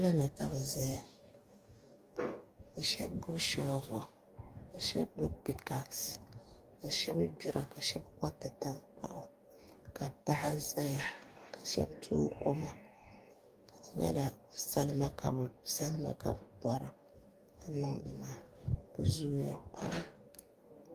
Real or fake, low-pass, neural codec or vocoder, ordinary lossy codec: fake; 14.4 kHz; codec, 44.1 kHz, 2.6 kbps, DAC; Opus, 32 kbps